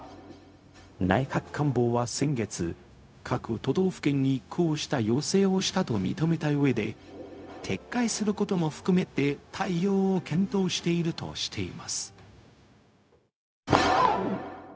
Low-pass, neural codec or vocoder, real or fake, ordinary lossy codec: none; codec, 16 kHz, 0.4 kbps, LongCat-Audio-Codec; fake; none